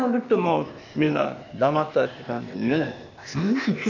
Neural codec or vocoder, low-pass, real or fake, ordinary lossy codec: codec, 16 kHz, 0.8 kbps, ZipCodec; 7.2 kHz; fake; none